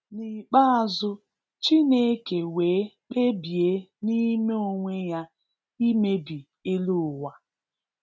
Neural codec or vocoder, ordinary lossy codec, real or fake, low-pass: none; none; real; none